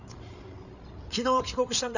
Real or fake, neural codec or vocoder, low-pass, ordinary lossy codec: fake; codec, 16 kHz, 8 kbps, FreqCodec, larger model; 7.2 kHz; none